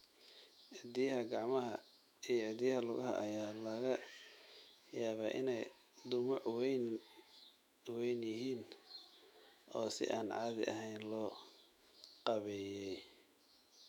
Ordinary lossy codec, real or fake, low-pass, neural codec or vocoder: none; fake; 19.8 kHz; autoencoder, 48 kHz, 128 numbers a frame, DAC-VAE, trained on Japanese speech